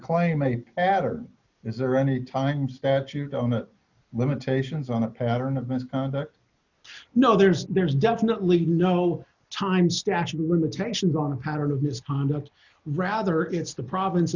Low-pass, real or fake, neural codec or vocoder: 7.2 kHz; real; none